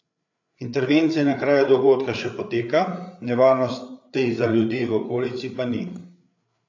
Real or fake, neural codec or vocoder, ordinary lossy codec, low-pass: fake; codec, 16 kHz, 8 kbps, FreqCodec, larger model; AAC, 48 kbps; 7.2 kHz